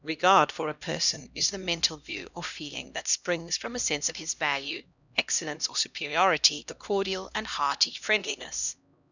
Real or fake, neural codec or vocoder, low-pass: fake; codec, 16 kHz, 1 kbps, X-Codec, HuBERT features, trained on LibriSpeech; 7.2 kHz